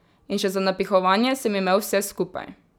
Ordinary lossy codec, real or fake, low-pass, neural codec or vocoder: none; real; none; none